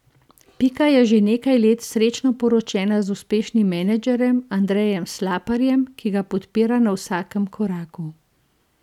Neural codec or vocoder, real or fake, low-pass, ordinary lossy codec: none; real; 19.8 kHz; none